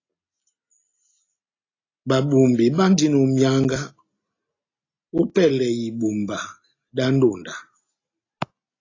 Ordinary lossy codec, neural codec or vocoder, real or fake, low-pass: AAC, 32 kbps; none; real; 7.2 kHz